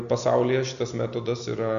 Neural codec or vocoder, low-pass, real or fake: none; 7.2 kHz; real